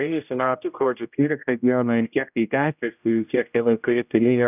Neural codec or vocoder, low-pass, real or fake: codec, 16 kHz, 0.5 kbps, X-Codec, HuBERT features, trained on general audio; 3.6 kHz; fake